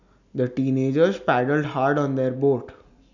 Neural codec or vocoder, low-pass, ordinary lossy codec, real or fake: none; 7.2 kHz; none; real